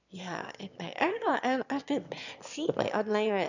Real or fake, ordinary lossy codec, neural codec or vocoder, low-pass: fake; none; autoencoder, 22.05 kHz, a latent of 192 numbers a frame, VITS, trained on one speaker; 7.2 kHz